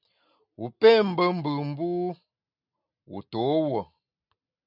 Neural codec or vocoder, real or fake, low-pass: none; real; 5.4 kHz